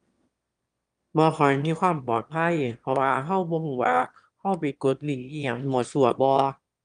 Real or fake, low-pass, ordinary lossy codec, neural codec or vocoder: fake; 9.9 kHz; Opus, 32 kbps; autoencoder, 22.05 kHz, a latent of 192 numbers a frame, VITS, trained on one speaker